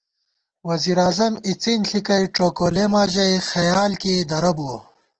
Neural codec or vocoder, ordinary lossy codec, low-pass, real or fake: none; Opus, 16 kbps; 7.2 kHz; real